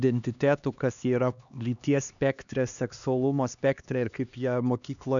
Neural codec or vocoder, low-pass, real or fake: codec, 16 kHz, 2 kbps, X-Codec, HuBERT features, trained on LibriSpeech; 7.2 kHz; fake